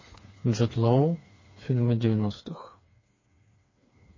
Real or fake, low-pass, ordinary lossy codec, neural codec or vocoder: fake; 7.2 kHz; MP3, 32 kbps; codec, 16 kHz, 4 kbps, FreqCodec, smaller model